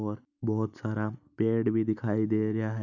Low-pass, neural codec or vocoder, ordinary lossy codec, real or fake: 7.2 kHz; none; none; real